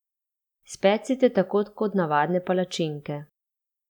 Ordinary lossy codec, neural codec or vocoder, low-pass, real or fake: none; none; 19.8 kHz; real